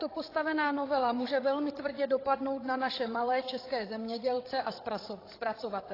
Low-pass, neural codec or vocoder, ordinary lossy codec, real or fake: 5.4 kHz; codec, 16 kHz, 16 kbps, FreqCodec, larger model; AAC, 24 kbps; fake